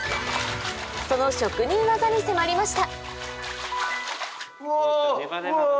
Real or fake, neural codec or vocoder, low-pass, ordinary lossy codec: real; none; none; none